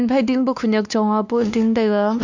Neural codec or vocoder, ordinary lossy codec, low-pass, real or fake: codec, 16 kHz, 2 kbps, X-Codec, WavLM features, trained on Multilingual LibriSpeech; none; 7.2 kHz; fake